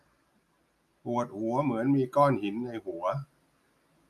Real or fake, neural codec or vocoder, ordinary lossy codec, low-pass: real; none; none; 14.4 kHz